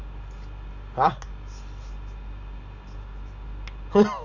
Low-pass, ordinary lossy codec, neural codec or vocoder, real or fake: 7.2 kHz; none; none; real